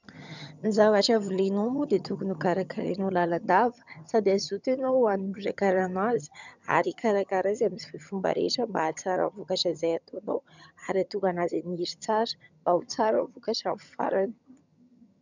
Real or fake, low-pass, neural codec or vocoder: fake; 7.2 kHz; vocoder, 22.05 kHz, 80 mel bands, HiFi-GAN